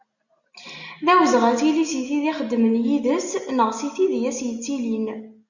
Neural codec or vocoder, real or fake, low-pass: none; real; 7.2 kHz